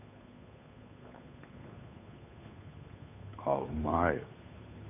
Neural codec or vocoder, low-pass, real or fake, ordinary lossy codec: codec, 16 kHz, 8 kbps, FunCodec, trained on Chinese and English, 25 frames a second; 3.6 kHz; fake; none